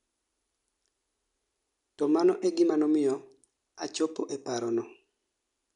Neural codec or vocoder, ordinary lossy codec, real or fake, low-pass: none; none; real; 10.8 kHz